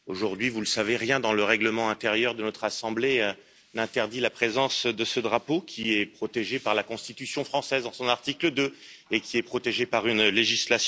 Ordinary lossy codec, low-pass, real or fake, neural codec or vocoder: none; none; real; none